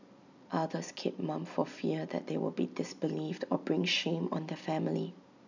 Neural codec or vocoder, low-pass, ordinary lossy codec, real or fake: none; 7.2 kHz; none; real